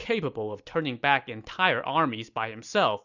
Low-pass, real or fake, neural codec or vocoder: 7.2 kHz; real; none